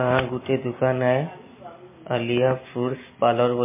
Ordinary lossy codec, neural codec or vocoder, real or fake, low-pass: MP3, 16 kbps; none; real; 3.6 kHz